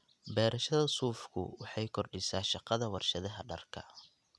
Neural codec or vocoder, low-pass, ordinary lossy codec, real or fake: none; none; none; real